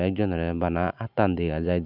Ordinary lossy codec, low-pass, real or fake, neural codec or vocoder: none; 5.4 kHz; real; none